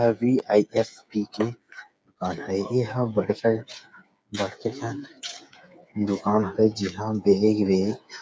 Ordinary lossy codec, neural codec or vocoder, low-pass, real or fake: none; codec, 16 kHz, 8 kbps, FreqCodec, smaller model; none; fake